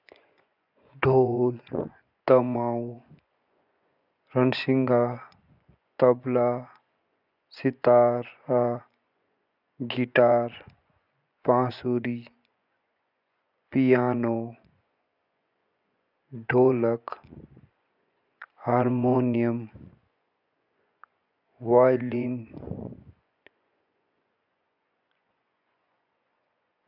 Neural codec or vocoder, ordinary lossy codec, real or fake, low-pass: vocoder, 24 kHz, 100 mel bands, Vocos; none; fake; 5.4 kHz